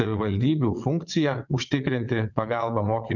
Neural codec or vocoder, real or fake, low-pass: vocoder, 22.05 kHz, 80 mel bands, WaveNeXt; fake; 7.2 kHz